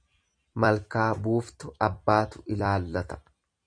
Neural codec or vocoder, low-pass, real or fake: vocoder, 44.1 kHz, 128 mel bands every 256 samples, BigVGAN v2; 9.9 kHz; fake